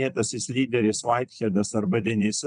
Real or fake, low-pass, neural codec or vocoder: fake; 9.9 kHz; vocoder, 22.05 kHz, 80 mel bands, WaveNeXt